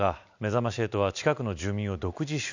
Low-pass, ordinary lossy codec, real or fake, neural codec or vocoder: 7.2 kHz; none; real; none